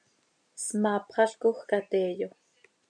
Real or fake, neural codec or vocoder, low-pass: real; none; 9.9 kHz